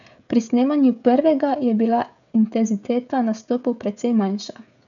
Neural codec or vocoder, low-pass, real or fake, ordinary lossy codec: codec, 16 kHz, 16 kbps, FreqCodec, smaller model; 7.2 kHz; fake; none